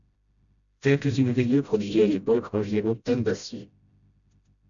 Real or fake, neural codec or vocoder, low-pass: fake; codec, 16 kHz, 0.5 kbps, FreqCodec, smaller model; 7.2 kHz